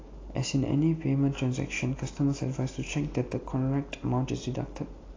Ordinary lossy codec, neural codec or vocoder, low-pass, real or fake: AAC, 32 kbps; none; 7.2 kHz; real